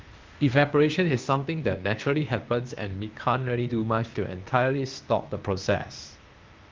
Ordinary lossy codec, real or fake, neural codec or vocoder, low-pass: Opus, 32 kbps; fake; codec, 16 kHz, 0.8 kbps, ZipCodec; 7.2 kHz